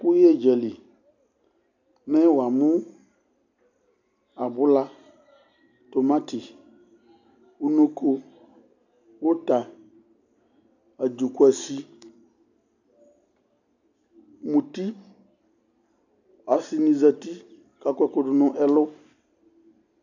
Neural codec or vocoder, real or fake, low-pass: none; real; 7.2 kHz